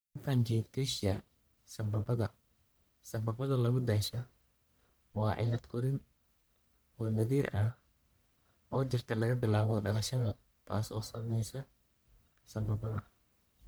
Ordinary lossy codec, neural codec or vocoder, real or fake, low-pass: none; codec, 44.1 kHz, 1.7 kbps, Pupu-Codec; fake; none